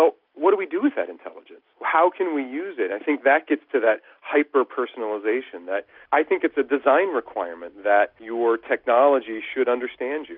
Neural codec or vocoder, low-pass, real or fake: none; 5.4 kHz; real